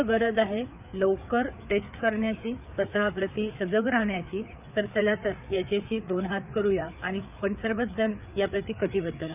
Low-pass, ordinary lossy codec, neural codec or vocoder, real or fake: 3.6 kHz; none; codec, 16 kHz, 4 kbps, FreqCodec, larger model; fake